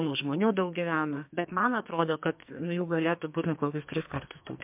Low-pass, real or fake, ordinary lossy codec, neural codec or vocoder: 3.6 kHz; fake; MP3, 32 kbps; codec, 44.1 kHz, 2.6 kbps, SNAC